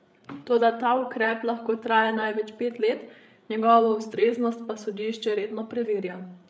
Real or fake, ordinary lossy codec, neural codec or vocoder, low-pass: fake; none; codec, 16 kHz, 8 kbps, FreqCodec, larger model; none